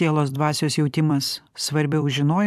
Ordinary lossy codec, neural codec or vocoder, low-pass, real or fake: AAC, 96 kbps; vocoder, 44.1 kHz, 128 mel bands every 256 samples, BigVGAN v2; 14.4 kHz; fake